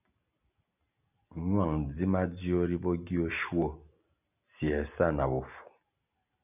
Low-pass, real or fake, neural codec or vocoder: 3.6 kHz; real; none